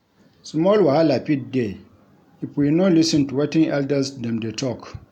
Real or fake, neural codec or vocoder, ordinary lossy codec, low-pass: real; none; none; 19.8 kHz